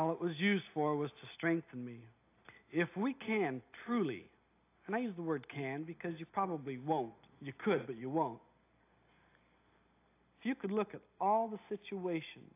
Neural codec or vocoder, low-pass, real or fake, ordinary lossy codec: none; 3.6 kHz; real; AAC, 24 kbps